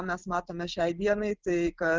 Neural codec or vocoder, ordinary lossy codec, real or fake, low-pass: none; Opus, 16 kbps; real; 7.2 kHz